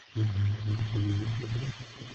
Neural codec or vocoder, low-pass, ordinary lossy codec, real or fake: codec, 16 kHz, 4.8 kbps, FACodec; 7.2 kHz; Opus, 16 kbps; fake